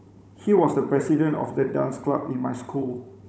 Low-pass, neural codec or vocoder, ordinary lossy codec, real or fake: none; codec, 16 kHz, 16 kbps, FunCodec, trained on Chinese and English, 50 frames a second; none; fake